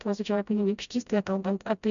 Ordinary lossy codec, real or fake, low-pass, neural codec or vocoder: MP3, 96 kbps; fake; 7.2 kHz; codec, 16 kHz, 0.5 kbps, FreqCodec, smaller model